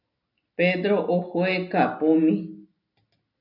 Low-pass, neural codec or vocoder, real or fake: 5.4 kHz; none; real